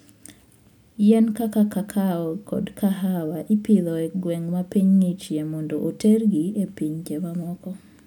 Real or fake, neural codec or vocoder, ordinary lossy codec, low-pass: real; none; none; 19.8 kHz